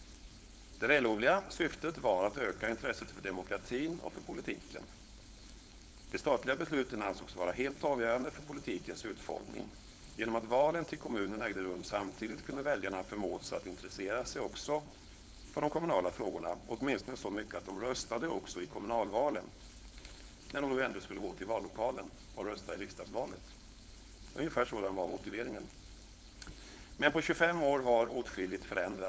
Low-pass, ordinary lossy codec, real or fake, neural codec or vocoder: none; none; fake; codec, 16 kHz, 4.8 kbps, FACodec